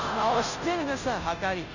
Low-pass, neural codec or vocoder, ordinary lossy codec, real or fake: 7.2 kHz; codec, 16 kHz, 0.5 kbps, FunCodec, trained on Chinese and English, 25 frames a second; none; fake